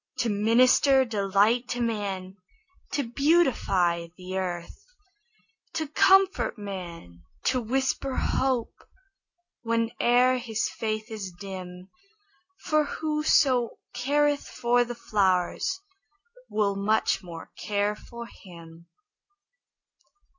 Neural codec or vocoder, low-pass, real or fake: none; 7.2 kHz; real